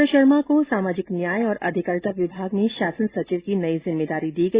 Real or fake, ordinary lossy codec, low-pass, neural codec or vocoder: real; Opus, 64 kbps; 3.6 kHz; none